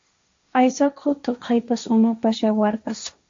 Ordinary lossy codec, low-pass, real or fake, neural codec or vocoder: MP3, 48 kbps; 7.2 kHz; fake; codec, 16 kHz, 1.1 kbps, Voila-Tokenizer